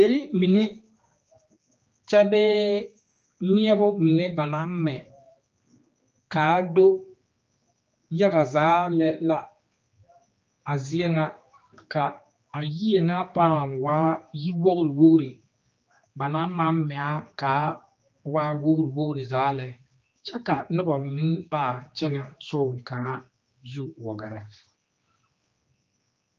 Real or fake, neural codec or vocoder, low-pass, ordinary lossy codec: fake; codec, 16 kHz, 2 kbps, X-Codec, HuBERT features, trained on general audio; 7.2 kHz; Opus, 24 kbps